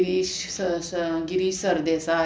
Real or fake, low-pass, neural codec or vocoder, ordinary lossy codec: real; none; none; none